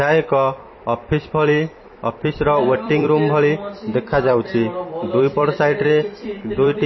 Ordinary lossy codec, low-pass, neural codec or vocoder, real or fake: MP3, 24 kbps; 7.2 kHz; vocoder, 44.1 kHz, 128 mel bands every 256 samples, BigVGAN v2; fake